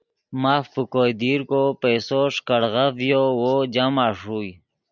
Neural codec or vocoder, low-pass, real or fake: none; 7.2 kHz; real